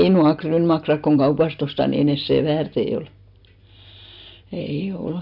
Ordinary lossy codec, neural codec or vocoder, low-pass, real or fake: none; none; 5.4 kHz; real